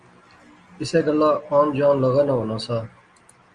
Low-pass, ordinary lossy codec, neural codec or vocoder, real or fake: 9.9 kHz; Opus, 32 kbps; none; real